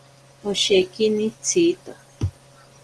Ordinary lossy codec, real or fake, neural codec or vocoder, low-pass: Opus, 16 kbps; real; none; 10.8 kHz